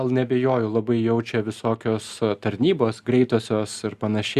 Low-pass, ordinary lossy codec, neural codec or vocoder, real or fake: 14.4 kHz; Opus, 64 kbps; none; real